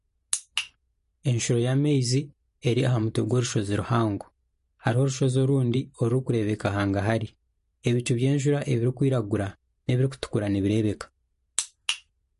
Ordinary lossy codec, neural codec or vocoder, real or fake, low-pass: MP3, 48 kbps; vocoder, 44.1 kHz, 128 mel bands every 512 samples, BigVGAN v2; fake; 14.4 kHz